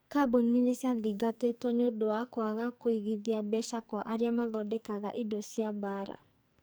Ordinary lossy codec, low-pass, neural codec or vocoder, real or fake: none; none; codec, 44.1 kHz, 2.6 kbps, SNAC; fake